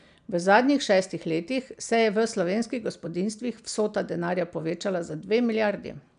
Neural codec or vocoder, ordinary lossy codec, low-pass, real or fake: none; none; 9.9 kHz; real